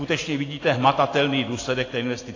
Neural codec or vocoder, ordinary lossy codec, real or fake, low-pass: none; AAC, 32 kbps; real; 7.2 kHz